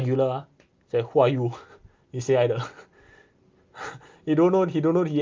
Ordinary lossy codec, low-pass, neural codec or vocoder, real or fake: Opus, 32 kbps; 7.2 kHz; none; real